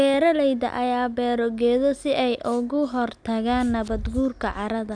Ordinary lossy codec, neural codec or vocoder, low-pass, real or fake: none; none; 9.9 kHz; real